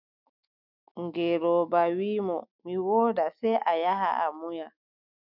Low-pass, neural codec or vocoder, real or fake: 5.4 kHz; autoencoder, 48 kHz, 128 numbers a frame, DAC-VAE, trained on Japanese speech; fake